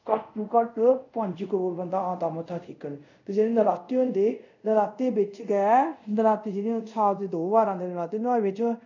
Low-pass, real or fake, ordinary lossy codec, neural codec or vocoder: 7.2 kHz; fake; none; codec, 24 kHz, 0.5 kbps, DualCodec